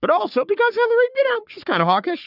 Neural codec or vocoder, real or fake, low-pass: codec, 44.1 kHz, 3.4 kbps, Pupu-Codec; fake; 5.4 kHz